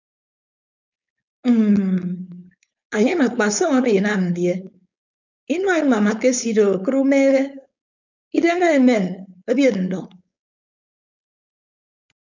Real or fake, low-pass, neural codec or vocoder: fake; 7.2 kHz; codec, 16 kHz, 4.8 kbps, FACodec